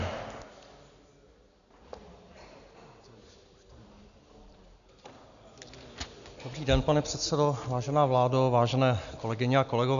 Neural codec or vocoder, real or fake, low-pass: none; real; 7.2 kHz